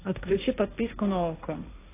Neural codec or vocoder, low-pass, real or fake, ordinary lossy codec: codec, 16 kHz, 1.1 kbps, Voila-Tokenizer; 3.6 kHz; fake; AAC, 16 kbps